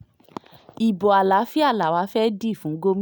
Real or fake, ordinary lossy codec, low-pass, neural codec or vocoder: real; none; none; none